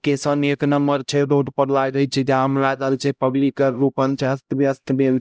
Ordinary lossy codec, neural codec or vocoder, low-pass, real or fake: none; codec, 16 kHz, 0.5 kbps, X-Codec, HuBERT features, trained on LibriSpeech; none; fake